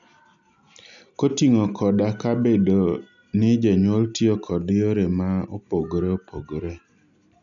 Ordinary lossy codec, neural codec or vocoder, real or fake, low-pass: none; none; real; 7.2 kHz